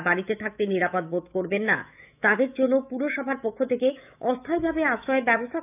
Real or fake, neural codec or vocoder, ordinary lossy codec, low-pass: fake; autoencoder, 48 kHz, 128 numbers a frame, DAC-VAE, trained on Japanese speech; none; 3.6 kHz